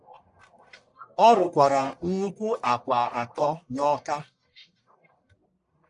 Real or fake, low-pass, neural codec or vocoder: fake; 10.8 kHz; codec, 44.1 kHz, 1.7 kbps, Pupu-Codec